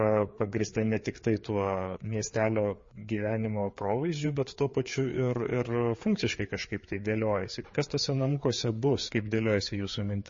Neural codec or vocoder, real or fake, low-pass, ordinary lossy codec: codec, 16 kHz, 4 kbps, FreqCodec, larger model; fake; 7.2 kHz; MP3, 32 kbps